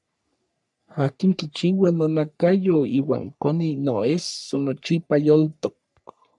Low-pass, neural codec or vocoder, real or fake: 10.8 kHz; codec, 44.1 kHz, 3.4 kbps, Pupu-Codec; fake